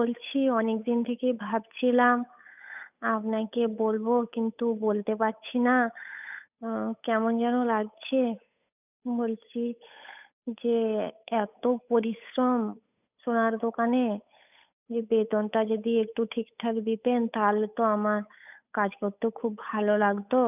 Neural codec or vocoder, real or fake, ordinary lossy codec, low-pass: codec, 16 kHz, 8 kbps, FunCodec, trained on Chinese and English, 25 frames a second; fake; none; 3.6 kHz